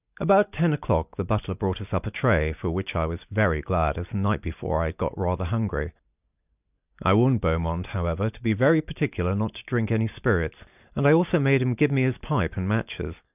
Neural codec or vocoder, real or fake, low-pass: none; real; 3.6 kHz